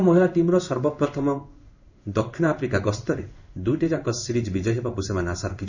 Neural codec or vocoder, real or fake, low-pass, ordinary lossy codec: codec, 16 kHz in and 24 kHz out, 1 kbps, XY-Tokenizer; fake; 7.2 kHz; none